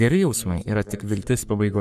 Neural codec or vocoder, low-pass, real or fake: codec, 44.1 kHz, 3.4 kbps, Pupu-Codec; 14.4 kHz; fake